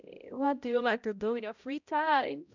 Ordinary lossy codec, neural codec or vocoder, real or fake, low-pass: none; codec, 16 kHz, 0.5 kbps, X-Codec, HuBERT features, trained on balanced general audio; fake; 7.2 kHz